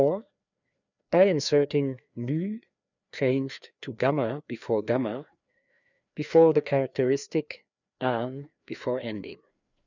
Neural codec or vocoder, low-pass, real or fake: codec, 16 kHz, 2 kbps, FreqCodec, larger model; 7.2 kHz; fake